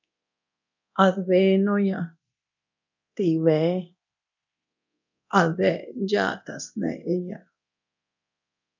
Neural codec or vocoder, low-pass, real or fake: codec, 24 kHz, 0.9 kbps, DualCodec; 7.2 kHz; fake